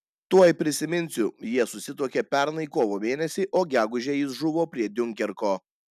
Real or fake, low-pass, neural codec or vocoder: real; 14.4 kHz; none